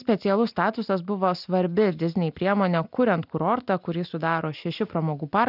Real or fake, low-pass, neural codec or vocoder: real; 5.4 kHz; none